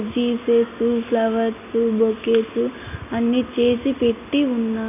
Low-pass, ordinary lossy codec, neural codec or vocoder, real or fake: 3.6 kHz; none; none; real